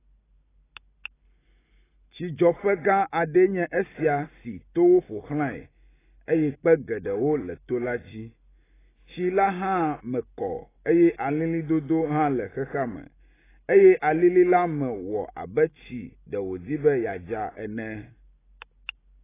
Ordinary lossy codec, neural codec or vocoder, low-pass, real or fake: AAC, 16 kbps; none; 3.6 kHz; real